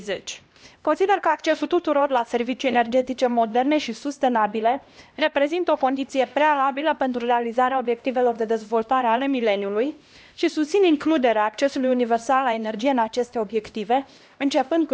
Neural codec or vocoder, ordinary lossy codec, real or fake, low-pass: codec, 16 kHz, 1 kbps, X-Codec, HuBERT features, trained on LibriSpeech; none; fake; none